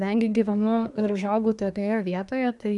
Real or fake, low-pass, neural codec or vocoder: fake; 10.8 kHz; codec, 24 kHz, 1 kbps, SNAC